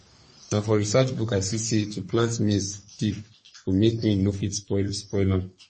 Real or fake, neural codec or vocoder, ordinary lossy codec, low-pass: fake; codec, 44.1 kHz, 3.4 kbps, Pupu-Codec; MP3, 32 kbps; 10.8 kHz